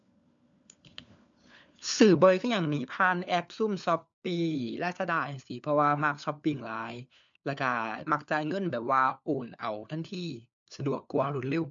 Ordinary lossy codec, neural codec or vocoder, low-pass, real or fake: MP3, 64 kbps; codec, 16 kHz, 4 kbps, FunCodec, trained on LibriTTS, 50 frames a second; 7.2 kHz; fake